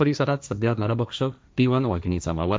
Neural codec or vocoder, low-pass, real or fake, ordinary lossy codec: codec, 16 kHz, 1.1 kbps, Voila-Tokenizer; none; fake; none